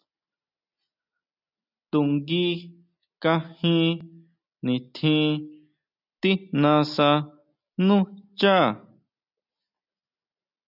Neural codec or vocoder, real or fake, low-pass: none; real; 5.4 kHz